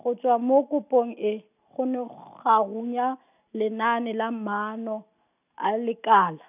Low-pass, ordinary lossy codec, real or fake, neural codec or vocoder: 3.6 kHz; none; real; none